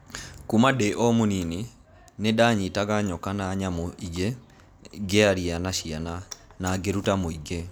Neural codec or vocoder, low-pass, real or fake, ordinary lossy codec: none; none; real; none